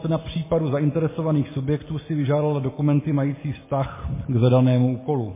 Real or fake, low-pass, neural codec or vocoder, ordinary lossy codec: real; 3.6 kHz; none; MP3, 16 kbps